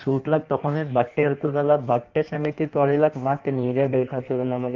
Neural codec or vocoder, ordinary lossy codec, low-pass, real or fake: codec, 16 kHz in and 24 kHz out, 1.1 kbps, FireRedTTS-2 codec; Opus, 24 kbps; 7.2 kHz; fake